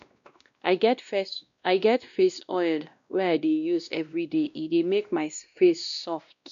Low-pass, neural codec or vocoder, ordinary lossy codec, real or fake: 7.2 kHz; codec, 16 kHz, 1 kbps, X-Codec, WavLM features, trained on Multilingual LibriSpeech; none; fake